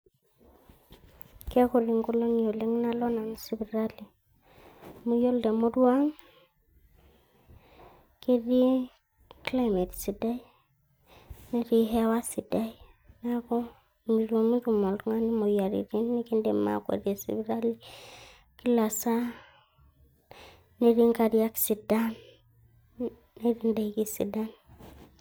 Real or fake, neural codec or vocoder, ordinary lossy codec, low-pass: real; none; none; none